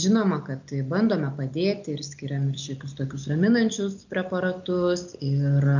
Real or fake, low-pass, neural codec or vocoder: real; 7.2 kHz; none